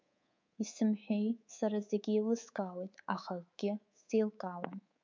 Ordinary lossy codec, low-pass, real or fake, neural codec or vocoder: MP3, 64 kbps; 7.2 kHz; fake; codec, 24 kHz, 3.1 kbps, DualCodec